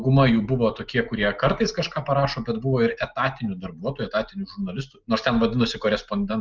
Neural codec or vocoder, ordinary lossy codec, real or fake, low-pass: none; Opus, 24 kbps; real; 7.2 kHz